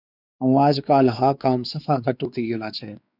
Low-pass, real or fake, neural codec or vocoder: 5.4 kHz; fake; codec, 16 kHz, 4 kbps, X-Codec, WavLM features, trained on Multilingual LibriSpeech